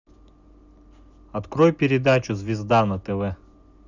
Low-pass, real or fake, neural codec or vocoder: 7.2 kHz; real; none